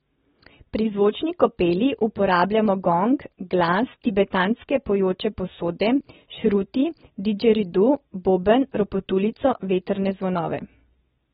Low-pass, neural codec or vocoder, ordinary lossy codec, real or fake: 19.8 kHz; none; AAC, 16 kbps; real